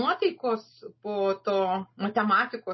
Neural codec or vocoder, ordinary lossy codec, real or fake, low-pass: none; MP3, 24 kbps; real; 7.2 kHz